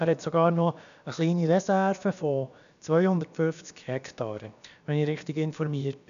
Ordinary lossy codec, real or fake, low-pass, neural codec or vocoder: none; fake; 7.2 kHz; codec, 16 kHz, about 1 kbps, DyCAST, with the encoder's durations